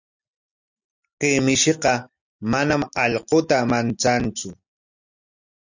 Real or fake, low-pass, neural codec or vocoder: real; 7.2 kHz; none